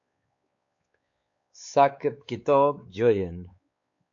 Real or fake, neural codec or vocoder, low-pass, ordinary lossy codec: fake; codec, 16 kHz, 4 kbps, X-Codec, WavLM features, trained on Multilingual LibriSpeech; 7.2 kHz; AAC, 48 kbps